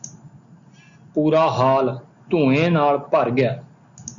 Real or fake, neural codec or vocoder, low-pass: real; none; 7.2 kHz